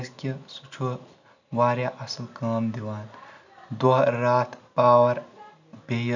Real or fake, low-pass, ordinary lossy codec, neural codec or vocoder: real; 7.2 kHz; none; none